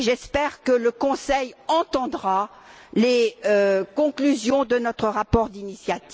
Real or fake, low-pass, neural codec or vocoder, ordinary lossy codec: real; none; none; none